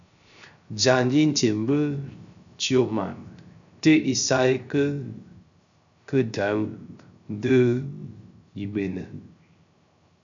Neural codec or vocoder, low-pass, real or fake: codec, 16 kHz, 0.3 kbps, FocalCodec; 7.2 kHz; fake